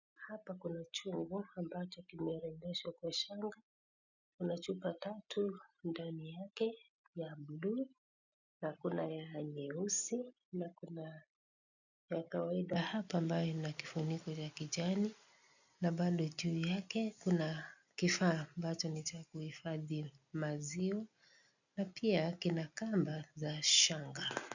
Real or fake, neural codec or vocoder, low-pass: real; none; 7.2 kHz